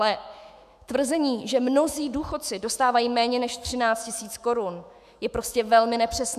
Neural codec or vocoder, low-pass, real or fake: autoencoder, 48 kHz, 128 numbers a frame, DAC-VAE, trained on Japanese speech; 14.4 kHz; fake